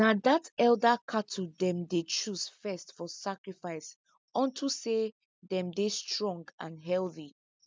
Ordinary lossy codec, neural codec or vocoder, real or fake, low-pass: none; none; real; none